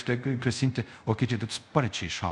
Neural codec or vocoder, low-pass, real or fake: codec, 24 kHz, 0.5 kbps, DualCodec; 10.8 kHz; fake